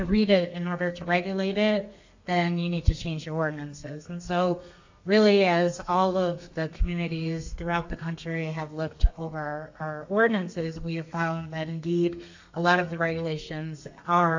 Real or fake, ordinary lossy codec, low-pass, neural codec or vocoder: fake; AAC, 48 kbps; 7.2 kHz; codec, 32 kHz, 1.9 kbps, SNAC